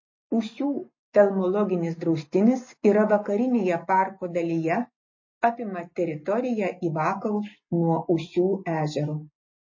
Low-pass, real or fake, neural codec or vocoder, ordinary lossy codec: 7.2 kHz; real; none; MP3, 32 kbps